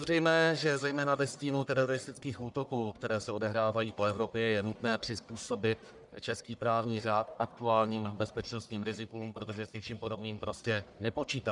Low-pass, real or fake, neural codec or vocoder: 10.8 kHz; fake; codec, 44.1 kHz, 1.7 kbps, Pupu-Codec